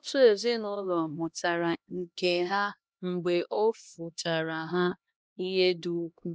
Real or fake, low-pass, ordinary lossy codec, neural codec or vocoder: fake; none; none; codec, 16 kHz, 1 kbps, X-Codec, HuBERT features, trained on LibriSpeech